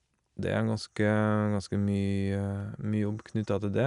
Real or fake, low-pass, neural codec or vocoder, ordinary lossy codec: real; 10.8 kHz; none; none